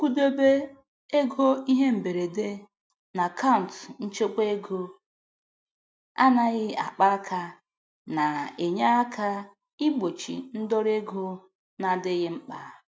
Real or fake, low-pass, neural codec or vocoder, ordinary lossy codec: real; none; none; none